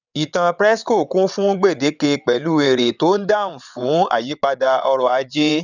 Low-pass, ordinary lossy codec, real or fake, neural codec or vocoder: 7.2 kHz; none; fake; vocoder, 22.05 kHz, 80 mel bands, WaveNeXt